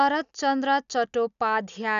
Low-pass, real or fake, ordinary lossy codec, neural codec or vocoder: 7.2 kHz; real; none; none